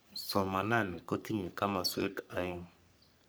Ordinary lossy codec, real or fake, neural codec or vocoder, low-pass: none; fake; codec, 44.1 kHz, 3.4 kbps, Pupu-Codec; none